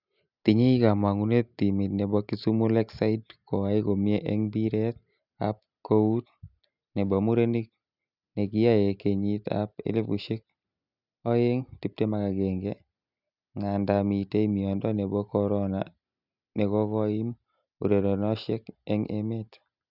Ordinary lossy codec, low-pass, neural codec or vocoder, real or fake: none; 5.4 kHz; none; real